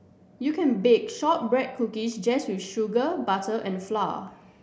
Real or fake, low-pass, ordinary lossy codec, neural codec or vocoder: real; none; none; none